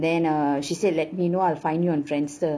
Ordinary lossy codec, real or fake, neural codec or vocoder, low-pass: none; real; none; none